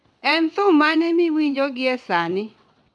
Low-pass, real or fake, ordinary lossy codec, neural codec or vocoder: none; fake; none; vocoder, 22.05 kHz, 80 mel bands, WaveNeXt